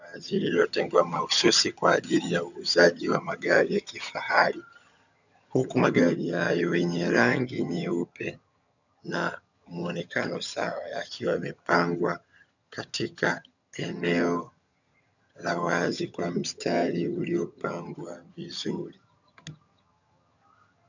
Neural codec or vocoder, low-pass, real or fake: vocoder, 22.05 kHz, 80 mel bands, HiFi-GAN; 7.2 kHz; fake